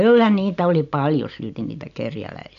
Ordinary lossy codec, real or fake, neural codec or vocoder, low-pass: none; real; none; 7.2 kHz